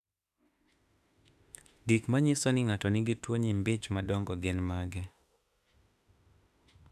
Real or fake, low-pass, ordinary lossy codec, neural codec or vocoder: fake; 14.4 kHz; none; autoencoder, 48 kHz, 32 numbers a frame, DAC-VAE, trained on Japanese speech